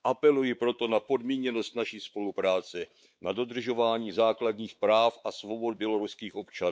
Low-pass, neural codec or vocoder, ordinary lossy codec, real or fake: none; codec, 16 kHz, 4 kbps, X-Codec, WavLM features, trained on Multilingual LibriSpeech; none; fake